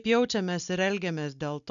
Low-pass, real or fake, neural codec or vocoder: 7.2 kHz; real; none